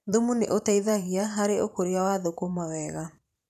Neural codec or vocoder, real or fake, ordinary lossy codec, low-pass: vocoder, 44.1 kHz, 128 mel bands every 512 samples, BigVGAN v2; fake; none; 14.4 kHz